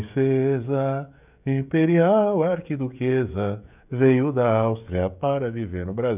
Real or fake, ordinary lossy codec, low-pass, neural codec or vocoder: fake; none; 3.6 kHz; codec, 16 kHz, 16 kbps, FreqCodec, smaller model